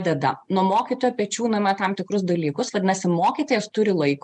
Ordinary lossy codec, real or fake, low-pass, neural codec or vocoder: MP3, 64 kbps; real; 10.8 kHz; none